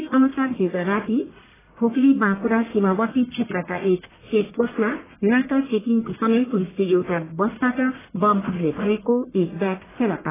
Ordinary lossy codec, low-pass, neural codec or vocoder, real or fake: AAC, 16 kbps; 3.6 kHz; codec, 44.1 kHz, 1.7 kbps, Pupu-Codec; fake